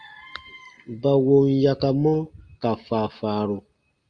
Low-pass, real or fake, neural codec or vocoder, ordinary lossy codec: 9.9 kHz; real; none; Opus, 32 kbps